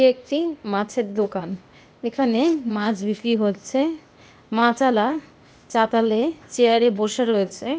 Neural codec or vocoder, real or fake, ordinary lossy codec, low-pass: codec, 16 kHz, 0.8 kbps, ZipCodec; fake; none; none